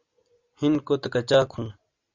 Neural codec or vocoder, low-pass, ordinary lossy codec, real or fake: none; 7.2 kHz; Opus, 64 kbps; real